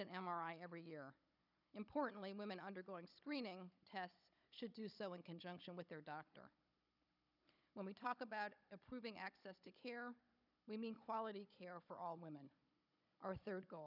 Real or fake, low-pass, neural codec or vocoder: fake; 5.4 kHz; codec, 16 kHz, 16 kbps, FunCodec, trained on Chinese and English, 50 frames a second